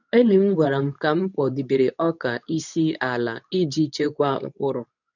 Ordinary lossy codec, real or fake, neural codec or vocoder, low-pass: none; fake; codec, 24 kHz, 0.9 kbps, WavTokenizer, medium speech release version 2; 7.2 kHz